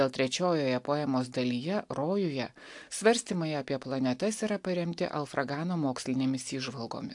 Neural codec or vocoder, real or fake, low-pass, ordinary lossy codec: none; real; 10.8 kHz; AAC, 64 kbps